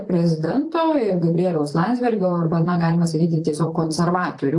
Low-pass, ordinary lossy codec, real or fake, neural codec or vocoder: 10.8 kHz; AAC, 64 kbps; fake; vocoder, 44.1 kHz, 128 mel bands, Pupu-Vocoder